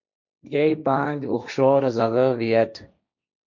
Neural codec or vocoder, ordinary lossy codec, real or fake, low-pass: codec, 16 kHz, 1.1 kbps, Voila-Tokenizer; MP3, 64 kbps; fake; 7.2 kHz